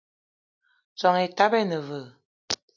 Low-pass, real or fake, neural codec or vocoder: 7.2 kHz; real; none